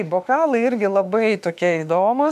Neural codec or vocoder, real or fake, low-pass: autoencoder, 48 kHz, 32 numbers a frame, DAC-VAE, trained on Japanese speech; fake; 14.4 kHz